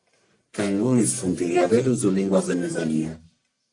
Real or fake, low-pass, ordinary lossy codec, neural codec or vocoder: fake; 10.8 kHz; AAC, 64 kbps; codec, 44.1 kHz, 1.7 kbps, Pupu-Codec